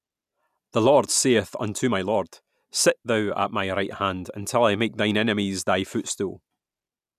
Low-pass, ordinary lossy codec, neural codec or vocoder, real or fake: 14.4 kHz; none; none; real